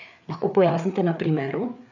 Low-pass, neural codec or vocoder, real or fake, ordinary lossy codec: 7.2 kHz; codec, 16 kHz, 4 kbps, FreqCodec, larger model; fake; none